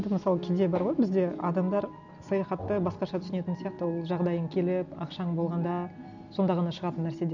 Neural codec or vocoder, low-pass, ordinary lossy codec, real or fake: none; 7.2 kHz; none; real